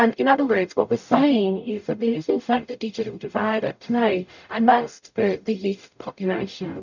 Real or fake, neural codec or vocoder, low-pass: fake; codec, 44.1 kHz, 0.9 kbps, DAC; 7.2 kHz